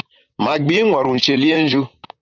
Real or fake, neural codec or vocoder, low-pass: fake; vocoder, 44.1 kHz, 128 mel bands, Pupu-Vocoder; 7.2 kHz